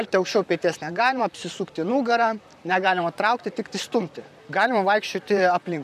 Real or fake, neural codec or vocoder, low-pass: fake; vocoder, 44.1 kHz, 128 mel bands, Pupu-Vocoder; 14.4 kHz